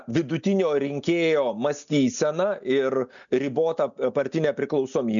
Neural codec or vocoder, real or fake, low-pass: none; real; 7.2 kHz